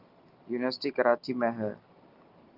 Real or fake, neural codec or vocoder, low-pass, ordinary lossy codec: real; none; 5.4 kHz; Opus, 32 kbps